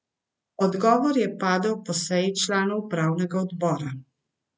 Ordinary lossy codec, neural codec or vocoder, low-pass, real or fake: none; none; none; real